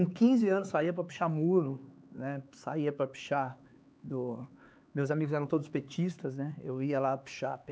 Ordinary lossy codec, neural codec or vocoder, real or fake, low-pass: none; codec, 16 kHz, 4 kbps, X-Codec, HuBERT features, trained on LibriSpeech; fake; none